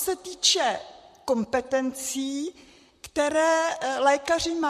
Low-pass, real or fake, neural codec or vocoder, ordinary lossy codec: 14.4 kHz; fake; vocoder, 44.1 kHz, 128 mel bands, Pupu-Vocoder; MP3, 64 kbps